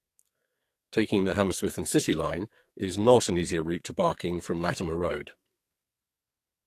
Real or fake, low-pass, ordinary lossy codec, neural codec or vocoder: fake; 14.4 kHz; AAC, 64 kbps; codec, 44.1 kHz, 2.6 kbps, SNAC